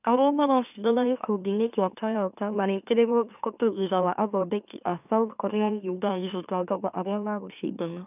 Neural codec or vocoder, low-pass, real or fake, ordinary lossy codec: autoencoder, 44.1 kHz, a latent of 192 numbers a frame, MeloTTS; 3.6 kHz; fake; none